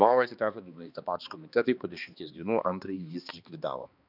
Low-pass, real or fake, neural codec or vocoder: 5.4 kHz; fake; codec, 16 kHz, 2 kbps, X-Codec, HuBERT features, trained on balanced general audio